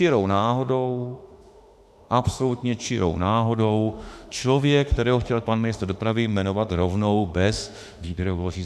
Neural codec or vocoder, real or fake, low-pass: autoencoder, 48 kHz, 32 numbers a frame, DAC-VAE, trained on Japanese speech; fake; 14.4 kHz